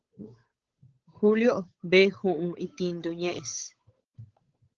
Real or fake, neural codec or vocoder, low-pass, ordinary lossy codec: fake; codec, 16 kHz, 8 kbps, FunCodec, trained on Chinese and English, 25 frames a second; 7.2 kHz; Opus, 16 kbps